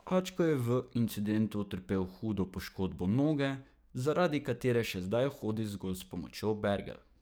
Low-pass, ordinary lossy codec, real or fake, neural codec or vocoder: none; none; fake; codec, 44.1 kHz, 7.8 kbps, DAC